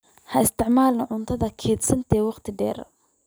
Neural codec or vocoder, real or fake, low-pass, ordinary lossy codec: none; real; none; none